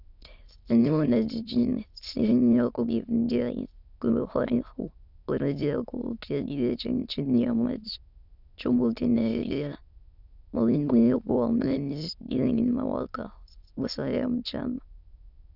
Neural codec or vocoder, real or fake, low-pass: autoencoder, 22.05 kHz, a latent of 192 numbers a frame, VITS, trained on many speakers; fake; 5.4 kHz